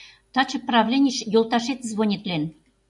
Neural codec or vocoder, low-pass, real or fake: none; 10.8 kHz; real